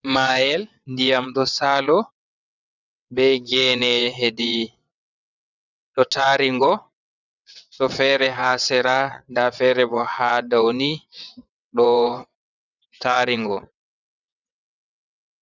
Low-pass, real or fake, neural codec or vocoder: 7.2 kHz; fake; vocoder, 22.05 kHz, 80 mel bands, WaveNeXt